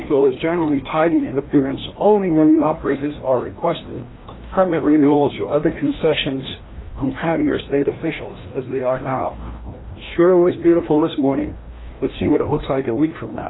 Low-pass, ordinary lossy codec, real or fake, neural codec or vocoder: 7.2 kHz; AAC, 16 kbps; fake; codec, 16 kHz, 1 kbps, FreqCodec, larger model